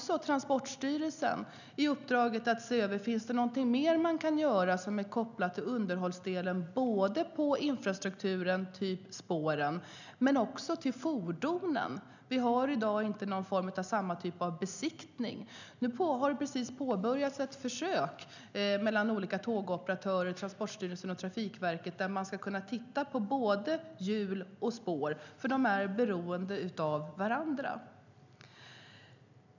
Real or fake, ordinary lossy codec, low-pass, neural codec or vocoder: real; none; 7.2 kHz; none